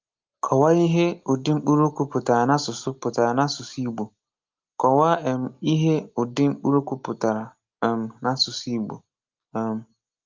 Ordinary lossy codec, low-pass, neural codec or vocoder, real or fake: Opus, 24 kbps; 7.2 kHz; none; real